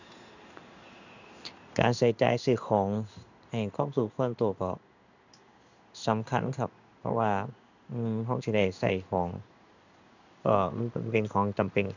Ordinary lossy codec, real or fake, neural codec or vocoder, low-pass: none; fake; codec, 16 kHz in and 24 kHz out, 1 kbps, XY-Tokenizer; 7.2 kHz